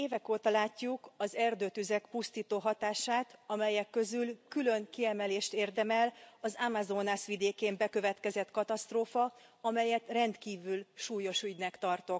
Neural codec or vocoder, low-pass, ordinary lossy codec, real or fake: none; none; none; real